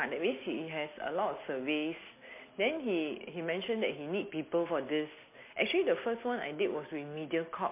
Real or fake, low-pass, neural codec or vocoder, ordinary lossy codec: real; 3.6 kHz; none; MP3, 24 kbps